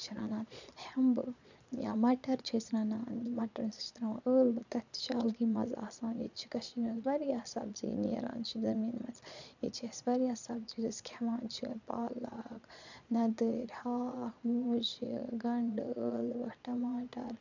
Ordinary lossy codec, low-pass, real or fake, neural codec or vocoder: none; 7.2 kHz; fake; vocoder, 22.05 kHz, 80 mel bands, Vocos